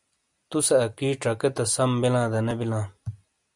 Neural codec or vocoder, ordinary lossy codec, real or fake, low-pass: none; MP3, 96 kbps; real; 10.8 kHz